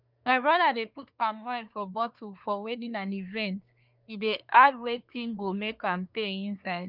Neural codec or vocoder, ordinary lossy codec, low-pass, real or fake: codec, 24 kHz, 1 kbps, SNAC; none; 5.4 kHz; fake